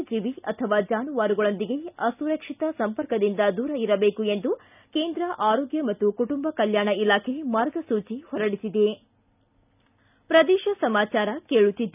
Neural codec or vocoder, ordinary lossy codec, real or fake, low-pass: none; none; real; 3.6 kHz